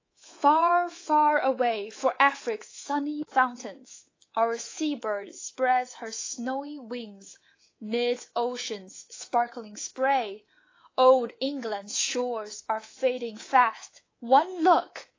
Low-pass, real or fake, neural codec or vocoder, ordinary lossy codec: 7.2 kHz; fake; codec, 24 kHz, 3.1 kbps, DualCodec; AAC, 32 kbps